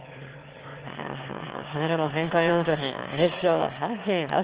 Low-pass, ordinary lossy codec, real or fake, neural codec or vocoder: 3.6 kHz; Opus, 16 kbps; fake; autoencoder, 22.05 kHz, a latent of 192 numbers a frame, VITS, trained on one speaker